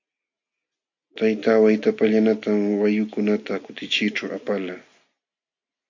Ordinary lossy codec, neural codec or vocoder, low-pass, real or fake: AAC, 48 kbps; none; 7.2 kHz; real